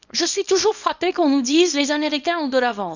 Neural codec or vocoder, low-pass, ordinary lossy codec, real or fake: codec, 24 kHz, 0.9 kbps, WavTokenizer, small release; 7.2 kHz; none; fake